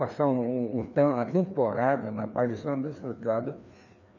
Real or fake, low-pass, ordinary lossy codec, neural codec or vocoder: fake; 7.2 kHz; none; codec, 16 kHz, 2 kbps, FreqCodec, larger model